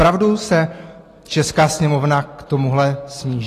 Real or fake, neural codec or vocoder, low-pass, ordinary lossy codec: real; none; 14.4 kHz; AAC, 48 kbps